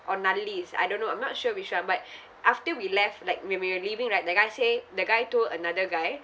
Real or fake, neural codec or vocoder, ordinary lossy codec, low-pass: real; none; none; none